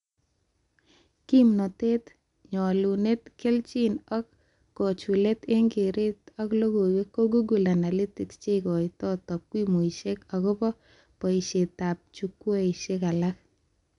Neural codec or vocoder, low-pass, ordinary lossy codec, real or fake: none; 10.8 kHz; none; real